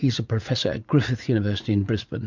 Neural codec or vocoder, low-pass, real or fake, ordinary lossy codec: vocoder, 44.1 kHz, 80 mel bands, Vocos; 7.2 kHz; fake; MP3, 64 kbps